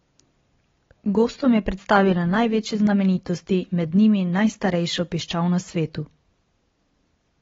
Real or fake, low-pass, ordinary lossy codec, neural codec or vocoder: real; 7.2 kHz; AAC, 24 kbps; none